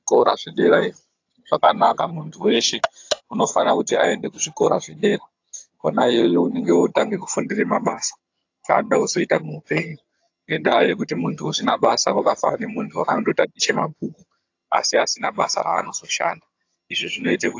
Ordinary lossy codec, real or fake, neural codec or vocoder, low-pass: AAC, 48 kbps; fake; vocoder, 22.05 kHz, 80 mel bands, HiFi-GAN; 7.2 kHz